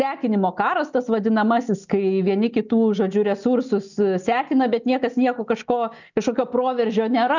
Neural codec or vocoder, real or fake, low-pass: none; real; 7.2 kHz